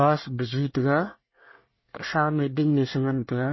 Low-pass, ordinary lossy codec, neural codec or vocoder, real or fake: 7.2 kHz; MP3, 24 kbps; codec, 24 kHz, 1 kbps, SNAC; fake